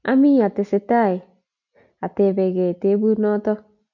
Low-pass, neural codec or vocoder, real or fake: 7.2 kHz; none; real